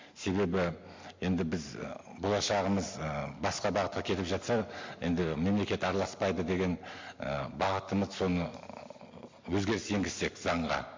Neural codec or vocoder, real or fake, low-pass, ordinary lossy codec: none; real; 7.2 kHz; MP3, 48 kbps